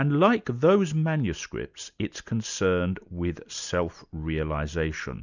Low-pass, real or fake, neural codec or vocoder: 7.2 kHz; real; none